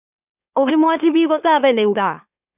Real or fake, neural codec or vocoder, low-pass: fake; autoencoder, 44.1 kHz, a latent of 192 numbers a frame, MeloTTS; 3.6 kHz